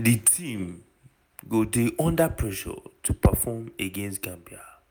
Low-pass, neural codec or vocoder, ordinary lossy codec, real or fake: none; none; none; real